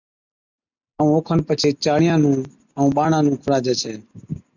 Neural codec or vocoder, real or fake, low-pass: none; real; 7.2 kHz